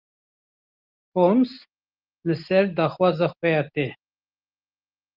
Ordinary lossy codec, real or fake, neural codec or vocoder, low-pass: Opus, 24 kbps; real; none; 5.4 kHz